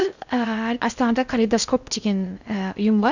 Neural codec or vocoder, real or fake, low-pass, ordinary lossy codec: codec, 16 kHz in and 24 kHz out, 0.6 kbps, FocalCodec, streaming, 4096 codes; fake; 7.2 kHz; none